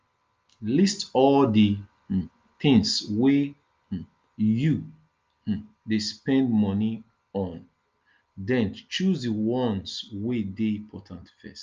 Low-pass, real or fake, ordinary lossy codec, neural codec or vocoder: 7.2 kHz; real; Opus, 24 kbps; none